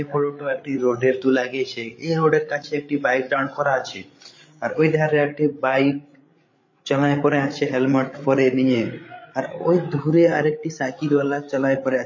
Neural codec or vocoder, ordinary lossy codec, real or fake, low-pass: codec, 16 kHz, 16 kbps, FreqCodec, larger model; MP3, 32 kbps; fake; 7.2 kHz